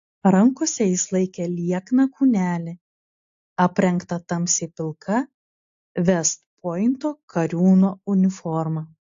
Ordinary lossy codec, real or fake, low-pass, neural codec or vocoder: AAC, 48 kbps; real; 7.2 kHz; none